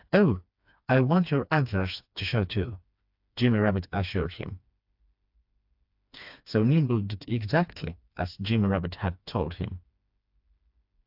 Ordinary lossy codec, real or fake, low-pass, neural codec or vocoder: AAC, 48 kbps; fake; 5.4 kHz; codec, 16 kHz, 2 kbps, FreqCodec, smaller model